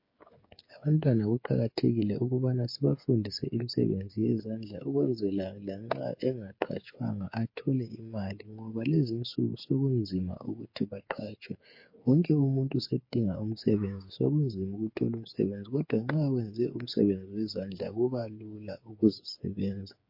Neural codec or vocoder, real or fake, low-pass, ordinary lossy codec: codec, 16 kHz, 8 kbps, FreqCodec, smaller model; fake; 5.4 kHz; MP3, 32 kbps